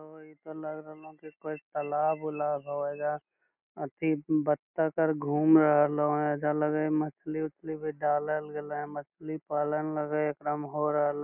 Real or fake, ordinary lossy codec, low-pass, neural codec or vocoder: real; none; 3.6 kHz; none